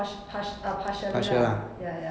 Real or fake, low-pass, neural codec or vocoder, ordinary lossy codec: real; none; none; none